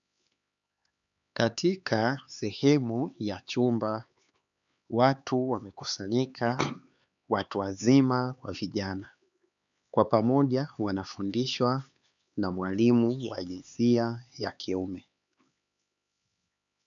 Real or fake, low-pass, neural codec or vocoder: fake; 7.2 kHz; codec, 16 kHz, 4 kbps, X-Codec, HuBERT features, trained on LibriSpeech